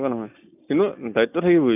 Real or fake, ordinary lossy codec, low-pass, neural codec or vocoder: real; none; 3.6 kHz; none